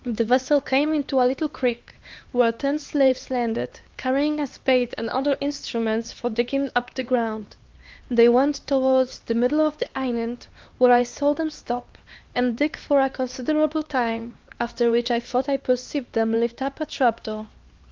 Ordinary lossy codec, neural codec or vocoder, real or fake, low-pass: Opus, 32 kbps; codec, 16 kHz, 2 kbps, X-Codec, HuBERT features, trained on LibriSpeech; fake; 7.2 kHz